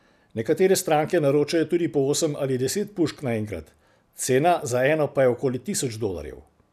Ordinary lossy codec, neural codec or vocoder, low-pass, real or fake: none; none; 14.4 kHz; real